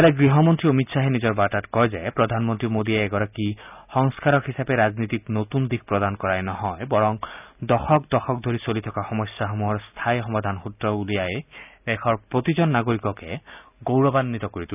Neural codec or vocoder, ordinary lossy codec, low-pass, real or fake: none; none; 3.6 kHz; real